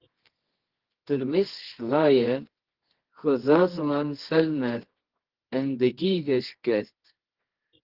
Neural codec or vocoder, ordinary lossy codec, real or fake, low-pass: codec, 24 kHz, 0.9 kbps, WavTokenizer, medium music audio release; Opus, 16 kbps; fake; 5.4 kHz